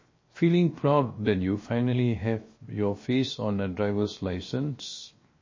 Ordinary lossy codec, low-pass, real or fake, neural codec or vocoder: MP3, 32 kbps; 7.2 kHz; fake; codec, 16 kHz, 0.7 kbps, FocalCodec